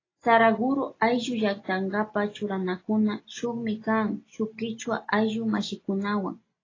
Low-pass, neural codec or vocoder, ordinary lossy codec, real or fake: 7.2 kHz; none; AAC, 32 kbps; real